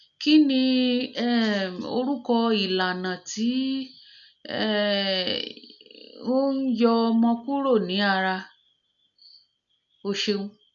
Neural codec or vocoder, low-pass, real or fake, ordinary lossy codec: none; 7.2 kHz; real; none